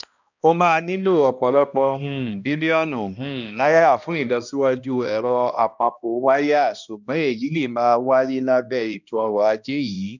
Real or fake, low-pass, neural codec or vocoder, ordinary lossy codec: fake; 7.2 kHz; codec, 16 kHz, 1 kbps, X-Codec, HuBERT features, trained on balanced general audio; none